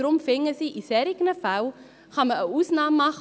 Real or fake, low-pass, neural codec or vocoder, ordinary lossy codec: real; none; none; none